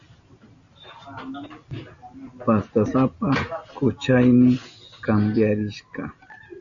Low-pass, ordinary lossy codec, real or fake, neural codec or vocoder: 7.2 kHz; MP3, 96 kbps; real; none